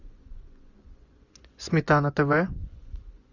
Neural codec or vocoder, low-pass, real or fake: vocoder, 24 kHz, 100 mel bands, Vocos; 7.2 kHz; fake